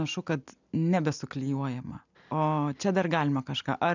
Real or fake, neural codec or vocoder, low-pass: real; none; 7.2 kHz